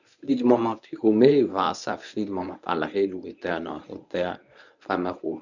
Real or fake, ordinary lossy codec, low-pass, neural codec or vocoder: fake; none; 7.2 kHz; codec, 24 kHz, 0.9 kbps, WavTokenizer, medium speech release version 1